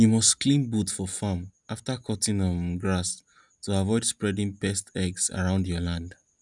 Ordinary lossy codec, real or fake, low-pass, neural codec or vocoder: none; real; 10.8 kHz; none